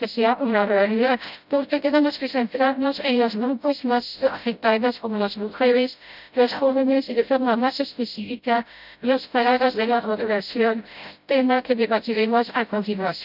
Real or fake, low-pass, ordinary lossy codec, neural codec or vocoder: fake; 5.4 kHz; none; codec, 16 kHz, 0.5 kbps, FreqCodec, smaller model